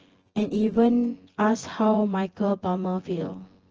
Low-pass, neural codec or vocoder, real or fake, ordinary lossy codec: 7.2 kHz; vocoder, 24 kHz, 100 mel bands, Vocos; fake; Opus, 16 kbps